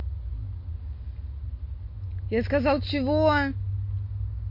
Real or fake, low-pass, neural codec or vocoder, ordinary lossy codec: real; 5.4 kHz; none; MP3, 32 kbps